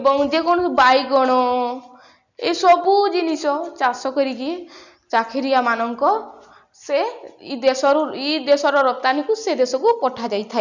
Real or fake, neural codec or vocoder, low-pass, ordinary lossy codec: real; none; 7.2 kHz; none